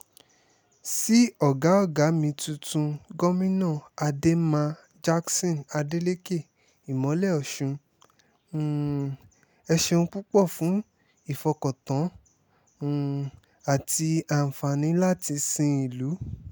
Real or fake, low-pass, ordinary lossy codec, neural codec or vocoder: real; none; none; none